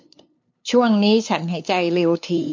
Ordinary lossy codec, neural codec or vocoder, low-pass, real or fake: MP3, 48 kbps; codec, 16 kHz, 2 kbps, FunCodec, trained on LibriTTS, 25 frames a second; 7.2 kHz; fake